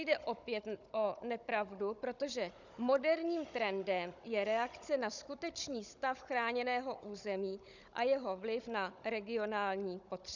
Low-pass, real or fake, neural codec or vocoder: 7.2 kHz; fake; codec, 16 kHz, 16 kbps, FunCodec, trained on Chinese and English, 50 frames a second